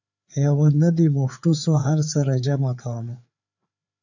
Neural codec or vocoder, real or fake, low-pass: codec, 16 kHz, 4 kbps, FreqCodec, larger model; fake; 7.2 kHz